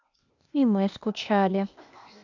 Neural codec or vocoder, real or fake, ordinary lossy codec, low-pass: codec, 16 kHz, 0.8 kbps, ZipCodec; fake; none; 7.2 kHz